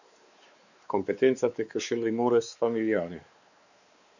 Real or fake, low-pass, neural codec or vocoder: fake; 7.2 kHz; codec, 16 kHz, 4 kbps, X-Codec, WavLM features, trained on Multilingual LibriSpeech